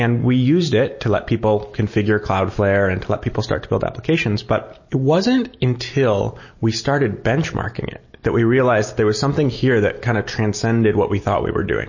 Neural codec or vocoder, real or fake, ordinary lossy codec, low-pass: none; real; MP3, 32 kbps; 7.2 kHz